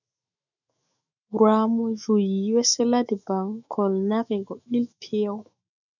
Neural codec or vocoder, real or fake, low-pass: autoencoder, 48 kHz, 128 numbers a frame, DAC-VAE, trained on Japanese speech; fake; 7.2 kHz